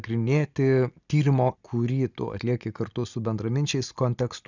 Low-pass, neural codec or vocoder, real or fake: 7.2 kHz; none; real